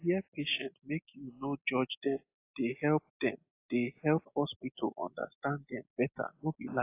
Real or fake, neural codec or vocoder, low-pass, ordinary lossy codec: real; none; 3.6 kHz; AAC, 24 kbps